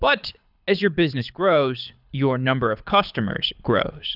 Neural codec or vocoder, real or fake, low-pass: codec, 24 kHz, 6 kbps, HILCodec; fake; 5.4 kHz